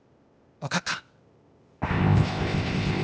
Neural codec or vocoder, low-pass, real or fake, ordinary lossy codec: codec, 16 kHz, 0.8 kbps, ZipCodec; none; fake; none